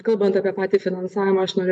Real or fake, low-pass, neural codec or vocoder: real; 10.8 kHz; none